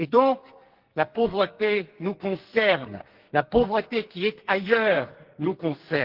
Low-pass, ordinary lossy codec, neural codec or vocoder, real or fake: 5.4 kHz; Opus, 16 kbps; codec, 44.1 kHz, 2.6 kbps, SNAC; fake